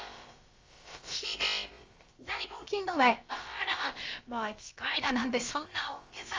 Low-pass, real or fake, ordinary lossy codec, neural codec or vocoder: 7.2 kHz; fake; Opus, 32 kbps; codec, 16 kHz, about 1 kbps, DyCAST, with the encoder's durations